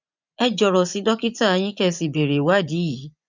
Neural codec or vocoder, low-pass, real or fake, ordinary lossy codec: vocoder, 24 kHz, 100 mel bands, Vocos; 7.2 kHz; fake; none